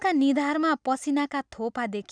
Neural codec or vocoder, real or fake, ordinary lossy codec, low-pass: none; real; none; 9.9 kHz